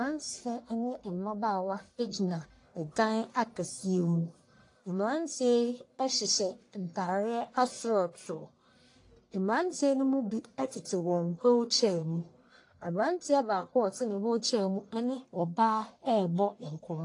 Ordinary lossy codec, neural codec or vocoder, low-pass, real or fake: AAC, 48 kbps; codec, 44.1 kHz, 1.7 kbps, Pupu-Codec; 10.8 kHz; fake